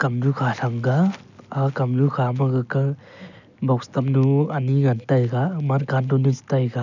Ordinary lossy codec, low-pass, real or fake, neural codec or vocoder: none; 7.2 kHz; real; none